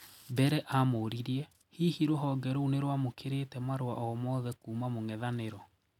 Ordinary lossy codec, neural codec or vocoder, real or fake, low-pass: none; none; real; 19.8 kHz